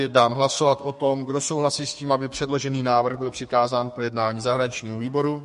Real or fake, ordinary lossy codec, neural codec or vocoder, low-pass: fake; MP3, 48 kbps; codec, 32 kHz, 1.9 kbps, SNAC; 14.4 kHz